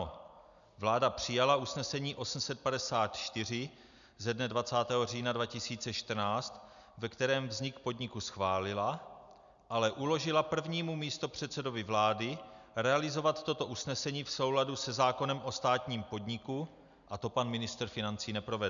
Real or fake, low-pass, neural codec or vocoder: real; 7.2 kHz; none